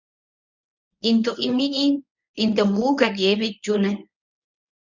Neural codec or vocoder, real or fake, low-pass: codec, 24 kHz, 0.9 kbps, WavTokenizer, medium speech release version 1; fake; 7.2 kHz